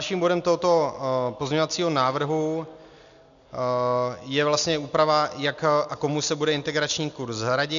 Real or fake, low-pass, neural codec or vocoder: real; 7.2 kHz; none